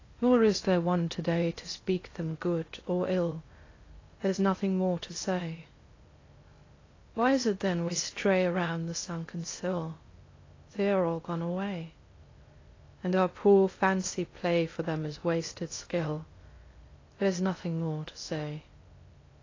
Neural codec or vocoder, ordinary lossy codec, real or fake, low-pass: codec, 16 kHz in and 24 kHz out, 0.6 kbps, FocalCodec, streaming, 2048 codes; AAC, 32 kbps; fake; 7.2 kHz